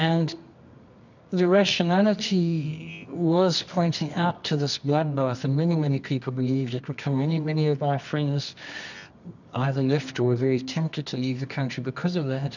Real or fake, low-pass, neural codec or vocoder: fake; 7.2 kHz; codec, 24 kHz, 0.9 kbps, WavTokenizer, medium music audio release